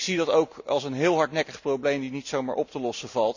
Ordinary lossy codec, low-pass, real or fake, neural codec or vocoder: none; 7.2 kHz; real; none